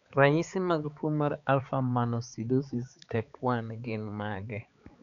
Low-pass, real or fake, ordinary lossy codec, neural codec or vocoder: 7.2 kHz; fake; none; codec, 16 kHz, 4 kbps, X-Codec, HuBERT features, trained on balanced general audio